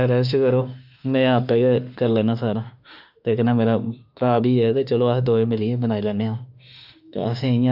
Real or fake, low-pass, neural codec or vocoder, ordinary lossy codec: fake; 5.4 kHz; autoencoder, 48 kHz, 32 numbers a frame, DAC-VAE, trained on Japanese speech; none